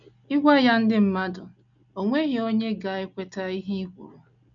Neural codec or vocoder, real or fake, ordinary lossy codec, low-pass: none; real; none; 7.2 kHz